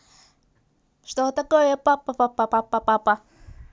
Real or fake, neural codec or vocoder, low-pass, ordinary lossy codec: real; none; none; none